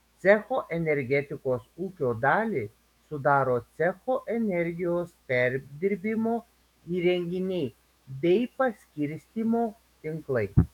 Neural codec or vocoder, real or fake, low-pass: autoencoder, 48 kHz, 128 numbers a frame, DAC-VAE, trained on Japanese speech; fake; 19.8 kHz